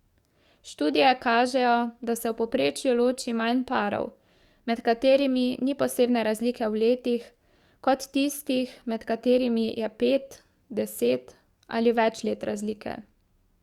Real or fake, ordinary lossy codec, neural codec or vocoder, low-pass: fake; Opus, 64 kbps; codec, 44.1 kHz, 7.8 kbps, DAC; 19.8 kHz